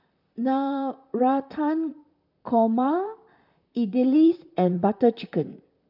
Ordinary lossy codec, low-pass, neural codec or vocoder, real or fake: none; 5.4 kHz; vocoder, 44.1 kHz, 128 mel bands, Pupu-Vocoder; fake